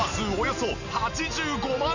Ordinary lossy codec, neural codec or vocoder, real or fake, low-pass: none; none; real; 7.2 kHz